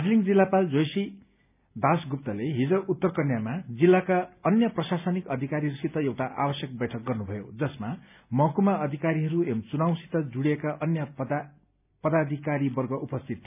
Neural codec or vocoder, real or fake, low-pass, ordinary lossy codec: none; real; 3.6 kHz; none